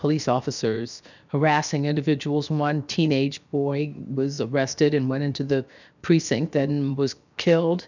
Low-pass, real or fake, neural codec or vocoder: 7.2 kHz; fake; codec, 16 kHz, 0.7 kbps, FocalCodec